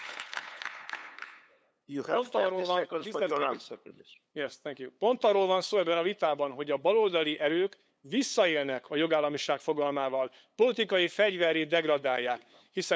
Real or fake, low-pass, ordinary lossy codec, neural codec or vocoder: fake; none; none; codec, 16 kHz, 8 kbps, FunCodec, trained on LibriTTS, 25 frames a second